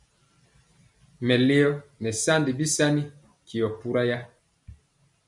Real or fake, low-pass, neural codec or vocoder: real; 10.8 kHz; none